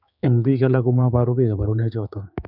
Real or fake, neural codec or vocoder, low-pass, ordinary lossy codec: fake; codec, 16 kHz, 4 kbps, X-Codec, HuBERT features, trained on general audio; 5.4 kHz; none